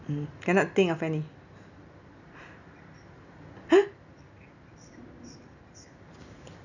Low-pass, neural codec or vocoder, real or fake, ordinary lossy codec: 7.2 kHz; none; real; none